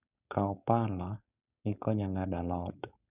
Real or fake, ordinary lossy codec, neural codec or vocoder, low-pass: fake; none; codec, 16 kHz, 4.8 kbps, FACodec; 3.6 kHz